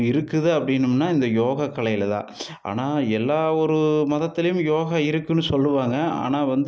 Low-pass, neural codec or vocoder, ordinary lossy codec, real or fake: none; none; none; real